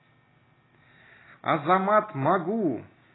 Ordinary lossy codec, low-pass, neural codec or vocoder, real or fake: AAC, 16 kbps; 7.2 kHz; none; real